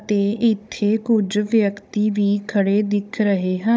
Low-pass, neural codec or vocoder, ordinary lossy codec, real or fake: none; codec, 16 kHz, 16 kbps, FunCodec, trained on Chinese and English, 50 frames a second; none; fake